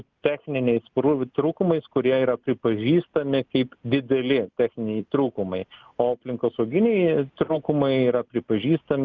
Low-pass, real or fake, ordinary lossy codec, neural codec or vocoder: 7.2 kHz; real; Opus, 24 kbps; none